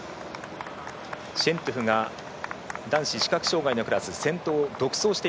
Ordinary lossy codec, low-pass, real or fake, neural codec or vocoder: none; none; real; none